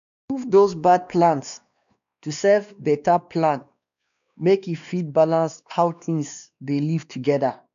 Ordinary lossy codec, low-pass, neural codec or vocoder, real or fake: none; 7.2 kHz; codec, 16 kHz, 2 kbps, X-Codec, WavLM features, trained on Multilingual LibriSpeech; fake